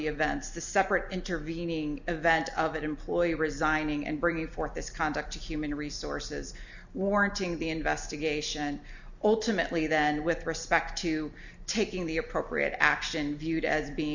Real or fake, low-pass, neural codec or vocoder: real; 7.2 kHz; none